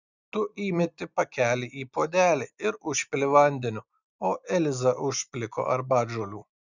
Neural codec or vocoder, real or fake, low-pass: none; real; 7.2 kHz